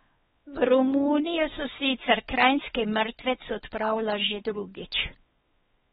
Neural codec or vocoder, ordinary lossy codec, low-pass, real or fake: codec, 16 kHz, 4 kbps, X-Codec, WavLM features, trained on Multilingual LibriSpeech; AAC, 16 kbps; 7.2 kHz; fake